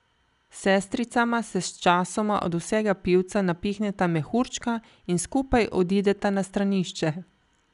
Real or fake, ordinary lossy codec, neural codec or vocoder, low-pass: real; none; none; 10.8 kHz